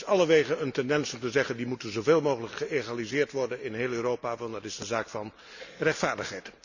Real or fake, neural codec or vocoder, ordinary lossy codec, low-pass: real; none; none; 7.2 kHz